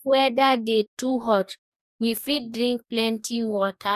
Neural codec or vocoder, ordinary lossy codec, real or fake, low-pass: codec, 44.1 kHz, 2.6 kbps, DAC; none; fake; 14.4 kHz